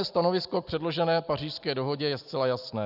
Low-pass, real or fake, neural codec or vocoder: 5.4 kHz; real; none